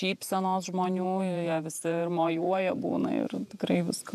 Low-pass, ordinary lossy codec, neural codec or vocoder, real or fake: 14.4 kHz; AAC, 96 kbps; vocoder, 48 kHz, 128 mel bands, Vocos; fake